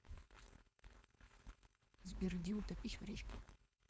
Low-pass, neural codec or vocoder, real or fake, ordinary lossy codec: none; codec, 16 kHz, 4.8 kbps, FACodec; fake; none